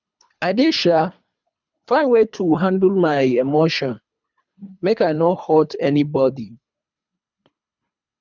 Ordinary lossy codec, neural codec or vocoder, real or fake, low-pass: none; codec, 24 kHz, 3 kbps, HILCodec; fake; 7.2 kHz